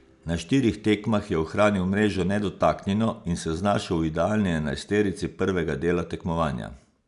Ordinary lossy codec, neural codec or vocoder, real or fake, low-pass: none; none; real; 10.8 kHz